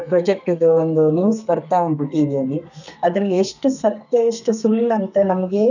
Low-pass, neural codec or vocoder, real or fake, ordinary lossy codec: 7.2 kHz; codec, 32 kHz, 1.9 kbps, SNAC; fake; none